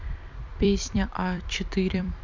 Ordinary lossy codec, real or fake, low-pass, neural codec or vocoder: none; fake; 7.2 kHz; vocoder, 44.1 kHz, 128 mel bands every 256 samples, BigVGAN v2